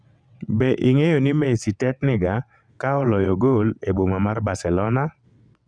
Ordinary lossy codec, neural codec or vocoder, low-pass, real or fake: none; vocoder, 22.05 kHz, 80 mel bands, WaveNeXt; 9.9 kHz; fake